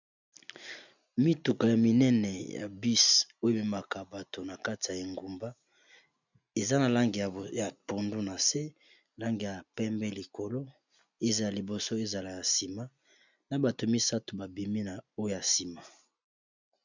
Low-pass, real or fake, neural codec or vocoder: 7.2 kHz; real; none